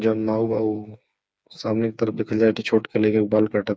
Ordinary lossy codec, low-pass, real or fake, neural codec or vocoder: none; none; fake; codec, 16 kHz, 4 kbps, FreqCodec, smaller model